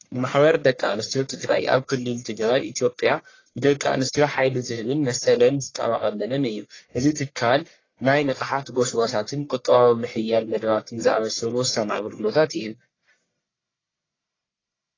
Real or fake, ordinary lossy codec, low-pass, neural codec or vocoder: fake; AAC, 32 kbps; 7.2 kHz; codec, 44.1 kHz, 1.7 kbps, Pupu-Codec